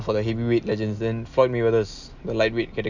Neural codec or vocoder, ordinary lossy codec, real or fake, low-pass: none; none; real; 7.2 kHz